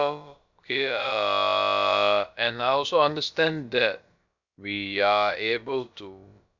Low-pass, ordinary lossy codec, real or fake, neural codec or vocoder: 7.2 kHz; none; fake; codec, 16 kHz, about 1 kbps, DyCAST, with the encoder's durations